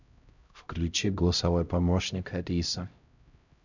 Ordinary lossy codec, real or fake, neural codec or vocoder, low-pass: none; fake; codec, 16 kHz, 0.5 kbps, X-Codec, HuBERT features, trained on LibriSpeech; 7.2 kHz